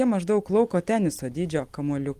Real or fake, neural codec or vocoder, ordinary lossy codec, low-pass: real; none; Opus, 24 kbps; 14.4 kHz